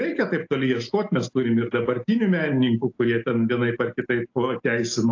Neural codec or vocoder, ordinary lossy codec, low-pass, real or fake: none; AAC, 48 kbps; 7.2 kHz; real